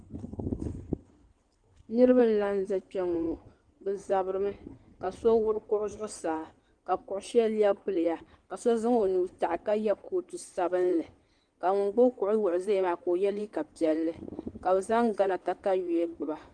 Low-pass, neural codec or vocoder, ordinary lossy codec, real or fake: 9.9 kHz; codec, 16 kHz in and 24 kHz out, 2.2 kbps, FireRedTTS-2 codec; Opus, 24 kbps; fake